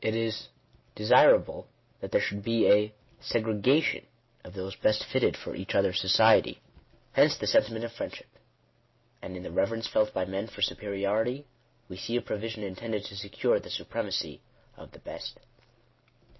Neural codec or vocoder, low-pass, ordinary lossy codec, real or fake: none; 7.2 kHz; MP3, 24 kbps; real